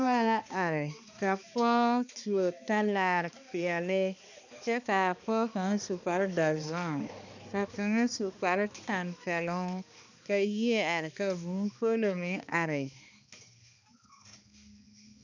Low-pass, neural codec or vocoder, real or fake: 7.2 kHz; codec, 16 kHz, 2 kbps, X-Codec, HuBERT features, trained on balanced general audio; fake